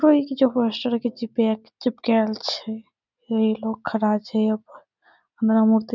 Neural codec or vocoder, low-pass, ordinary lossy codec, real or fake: none; 7.2 kHz; none; real